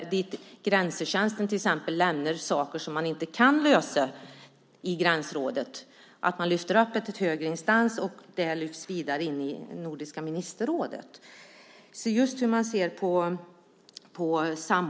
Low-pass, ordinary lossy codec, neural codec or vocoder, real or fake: none; none; none; real